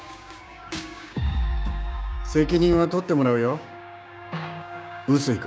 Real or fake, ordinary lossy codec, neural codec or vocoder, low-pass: fake; none; codec, 16 kHz, 6 kbps, DAC; none